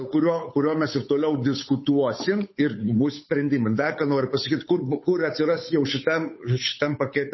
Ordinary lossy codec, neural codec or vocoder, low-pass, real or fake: MP3, 24 kbps; codec, 16 kHz, 8 kbps, FunCodec, trained on Chinese and English, 25 frames a second; 7.2 kHz; fake